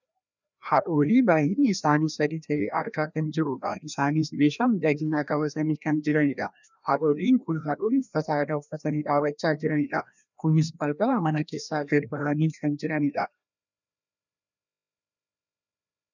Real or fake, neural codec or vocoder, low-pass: fake; codec, 16 kHz, 1 kbps, FreqCodec, larger model; 7.2 kHz